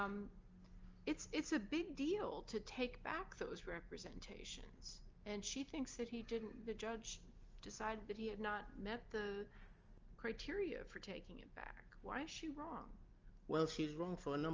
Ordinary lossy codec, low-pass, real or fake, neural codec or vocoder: Opus, 24 kbps; 7.2 kHz; real; none